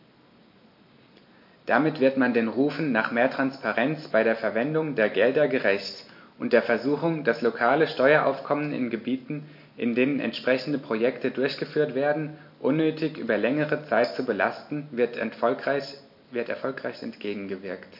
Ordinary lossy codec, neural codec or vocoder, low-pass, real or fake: MP3, 32 kbps; none; 5.4 kHz; real